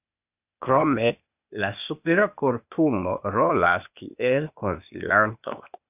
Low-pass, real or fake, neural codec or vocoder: 3.6 kHz; fake; codec, 16 kHz, 0.8 kbps, ZipCodec